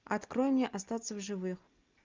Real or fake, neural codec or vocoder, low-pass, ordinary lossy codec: real; none; 7.2 kHz; Opus, 24 kbps